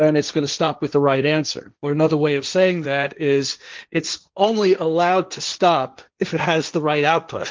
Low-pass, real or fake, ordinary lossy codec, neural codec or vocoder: 7.2 kHz; fake; Opus, 32 kbps; codec, 16 kHz, 1.1 kbps, Voila-Tokenizer